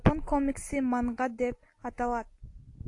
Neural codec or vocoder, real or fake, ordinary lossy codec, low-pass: none; real; AAC, 48 kbps; 10.8 kHz